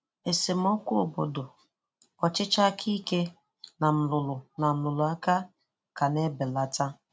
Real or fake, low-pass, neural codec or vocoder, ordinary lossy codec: real; none; none; none